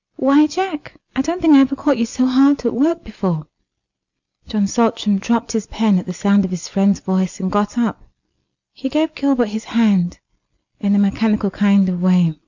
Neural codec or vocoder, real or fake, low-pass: none; real; 7.2 kHz